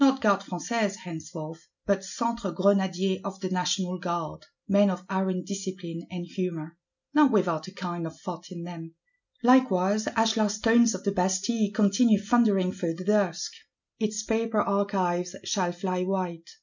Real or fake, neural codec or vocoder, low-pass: real; none; 7.2 kHz